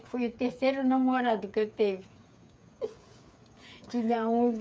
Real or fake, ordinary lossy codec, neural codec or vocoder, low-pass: fake; none; codec, 16 kHz, 8 kbps, FreqCodec, smaller model; none